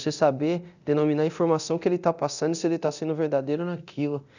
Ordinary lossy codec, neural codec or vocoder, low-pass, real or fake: none; codec, 24 kHz, 0.9 kbps, DualCodec; 7.2 kHz; fake